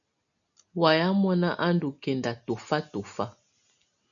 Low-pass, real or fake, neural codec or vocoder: 7.2 kHz; real; none